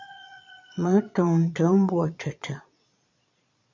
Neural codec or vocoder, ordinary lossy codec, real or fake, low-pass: vocoder, 22.05 kHz, 80 mel bands, Vocos; MP3, 64 kbps; fake; 7.2 kHz